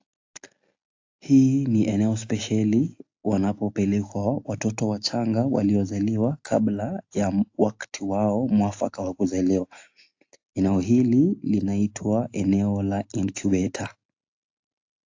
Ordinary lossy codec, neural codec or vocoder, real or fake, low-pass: AAC, 48 kbps; none; real; 7.2 kHz